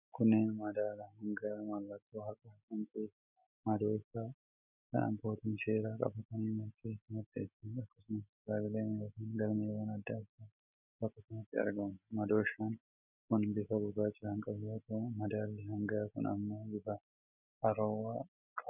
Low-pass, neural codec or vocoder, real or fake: 3.6 kHz; none; real